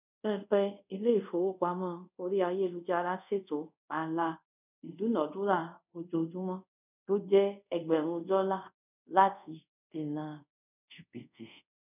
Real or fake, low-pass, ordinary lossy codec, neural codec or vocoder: fake; 3.6 kHz; none; codec, 24 kHz, 0.5 kbps, DualCodec